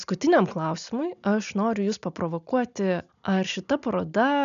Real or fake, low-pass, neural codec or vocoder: real; 7.2 kHz; none